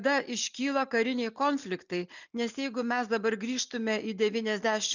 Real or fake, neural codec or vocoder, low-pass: real; none; 7.2 kHz